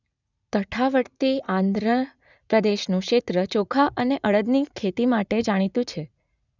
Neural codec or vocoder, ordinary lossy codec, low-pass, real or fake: vocoder, 44.1 kHz, 80 mel bands, Vocos; none; 7.2 kHz; fake